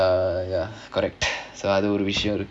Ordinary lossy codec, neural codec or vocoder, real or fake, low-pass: none; none; real; none